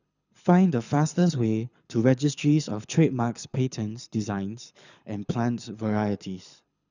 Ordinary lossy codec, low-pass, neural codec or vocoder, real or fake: none; 7.2 kHz; codec, 24 kHz, 6 kbps, HILCodec; fake